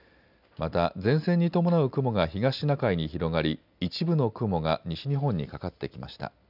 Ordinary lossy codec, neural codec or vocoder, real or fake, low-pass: none; none; real; 5.4 kHz